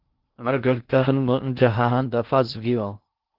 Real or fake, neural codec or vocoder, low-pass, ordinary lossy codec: fake; codec, 16 kHz in and 24 kHz out, 0.6 kbps, FocalCodec, streaming, 2048 codes; 5.4 kHz; Opus, 16 kbps